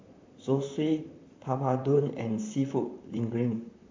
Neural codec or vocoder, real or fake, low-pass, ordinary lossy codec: vocoder, 44.1 kHz, 128 mel bands, Pupu-Vocoder; fake; 7.2 kHz; MP3, 64 kbps